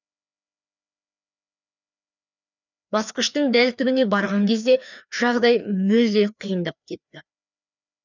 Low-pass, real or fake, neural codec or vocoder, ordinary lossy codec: 7.2 kHz; fake; codec, 16 kHz, 2 kbps, FreqCodec, larger model; none